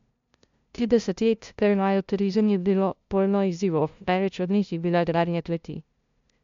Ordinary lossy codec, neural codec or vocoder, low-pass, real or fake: MP3, 64 kbps; codec, 16 kHz, 0.5 kbps, FunCodec, trained on LibriTTS, 25 frames a second; 7.2 kHz; fake